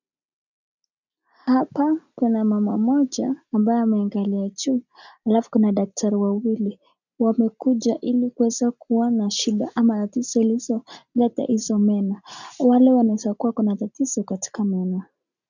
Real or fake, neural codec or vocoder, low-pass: real; none; 7.2 kHz